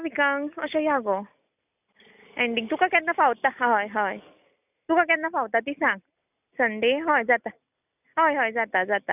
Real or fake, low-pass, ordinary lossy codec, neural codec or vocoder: real; 3.6 kHz; none; none